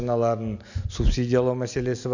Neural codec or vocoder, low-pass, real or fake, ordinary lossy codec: none; 7.2 kHz; real; none